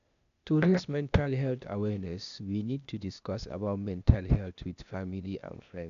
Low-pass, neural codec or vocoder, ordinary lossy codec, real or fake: 7.2 kHz; codec, 16 kHz, 0.8 kbps, ZipCodec; none; fake